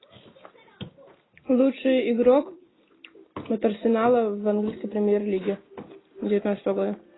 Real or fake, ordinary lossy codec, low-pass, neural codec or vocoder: real; AAC, 16 kbps; 7.2 kHz; none